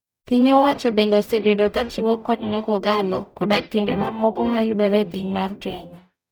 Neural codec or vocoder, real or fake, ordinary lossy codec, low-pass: codec, 44.1 kHz, 0.9 kbps, DAC; fake; none; none